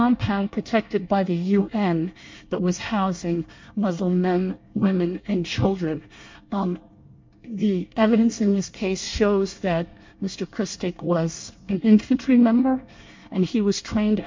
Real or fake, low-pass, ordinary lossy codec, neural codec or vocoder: fake; 7.2 kHz; MP3, 48 kbps; codec, 24 kHz, 1 kbps, SNAC